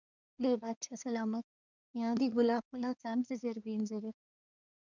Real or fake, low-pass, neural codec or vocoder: fake; 7.2 kHz; codec, 24 kHz, 1 kbps, SNAC